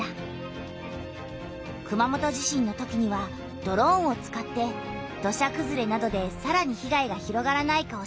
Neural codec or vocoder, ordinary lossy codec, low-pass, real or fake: none; none; none; real